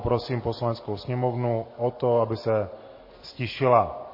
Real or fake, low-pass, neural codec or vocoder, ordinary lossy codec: real; 5.4 kHz; none; MP3, 24 kbps